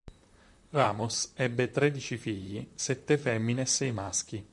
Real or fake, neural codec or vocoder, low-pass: fake; vocoder, 44.1 kHz, 128 mel bands, Pupu-Vocoder; 10.8 kHz